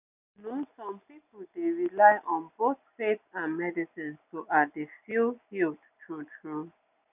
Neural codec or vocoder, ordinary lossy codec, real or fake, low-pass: none; none; real; 3.6 kHz